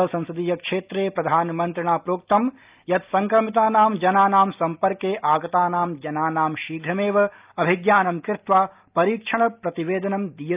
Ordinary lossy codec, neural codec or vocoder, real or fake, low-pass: Opus, 24 kbps; none; real; 3.6 kHz